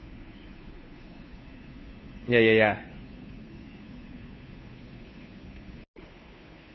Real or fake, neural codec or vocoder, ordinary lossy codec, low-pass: fake; codec, 16 kHz, 2 kbps, FunCodec, trained on Chinese and English, 25 frames a second; MP3, 24 kbps; 7.2 kHz